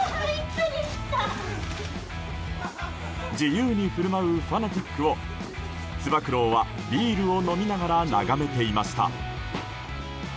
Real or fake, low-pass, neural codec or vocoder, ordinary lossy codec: real; none; none; none